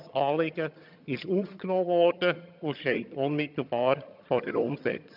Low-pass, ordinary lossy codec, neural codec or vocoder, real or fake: 5.4 kHz; none; vocoder, 22.05 kHz, 80 mel bands, HiFi-GAN; fake